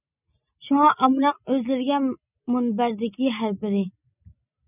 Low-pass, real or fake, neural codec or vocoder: 3.6 kHz; real; none